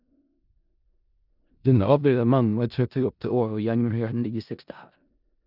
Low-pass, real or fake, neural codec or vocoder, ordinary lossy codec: 5.4 kHz; fake; codec, 16 kHz in and 24 kHz out, 0.4 kbps, LongCat-Audio-Codec, four codebook decoder; none